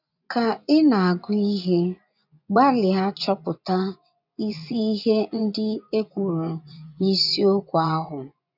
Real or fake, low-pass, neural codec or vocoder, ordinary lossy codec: fake; 5.4 kHz; vocoder, 24 kHz, 100 mel bands, Vocos; none